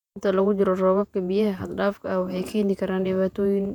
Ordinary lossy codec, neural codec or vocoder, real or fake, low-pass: none; vocoder, 48 kHz, 128 mel bands, Vocos; fake; 19.8 kHz